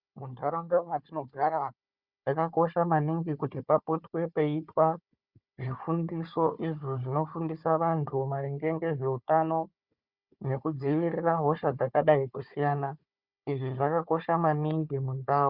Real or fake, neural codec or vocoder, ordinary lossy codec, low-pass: fake; codec, 16 kHz, 4 kbps, FunCodec, trained on Chinese and English, 50 frames a second; Opus, 64 kbps; 5.4 kHz